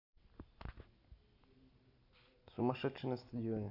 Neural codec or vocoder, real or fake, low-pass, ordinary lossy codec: none; real; 5.4 kHz; AAC, 48 kbps